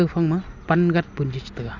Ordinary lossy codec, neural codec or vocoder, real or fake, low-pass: none; none; real; 7.2 kHz